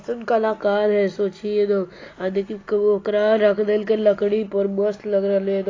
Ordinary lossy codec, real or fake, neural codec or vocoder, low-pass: AAC, 32 kbps; real; none; 7.2 kHz